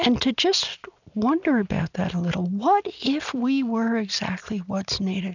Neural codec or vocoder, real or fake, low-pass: none; real; 7.2 kHz